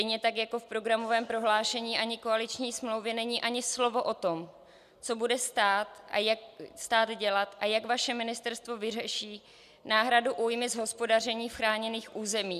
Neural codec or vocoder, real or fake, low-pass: none; real; 14.4 kHz